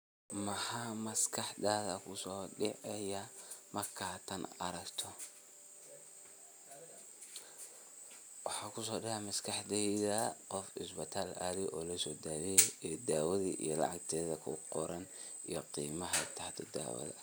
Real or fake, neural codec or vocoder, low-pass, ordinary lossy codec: real; none; none; none